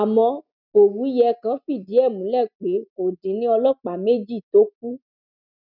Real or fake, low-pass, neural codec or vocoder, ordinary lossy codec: real; 5.4 kHz; none; none